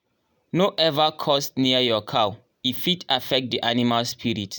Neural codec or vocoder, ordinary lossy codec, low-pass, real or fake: none; none; none; real